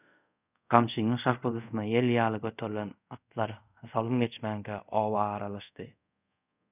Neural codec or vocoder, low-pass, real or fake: codec, 24 kHz, 0.5 kbps, DualCodec; 3.6 kHz; fake